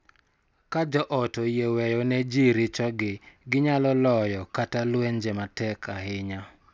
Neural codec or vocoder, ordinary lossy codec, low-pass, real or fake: none; none; none; real